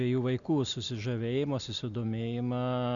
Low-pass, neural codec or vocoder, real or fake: 7.2 kHz; none; real